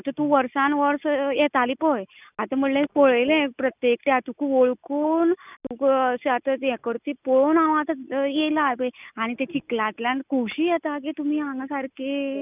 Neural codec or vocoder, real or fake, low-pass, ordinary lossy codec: none; real; 3.6 kHz; none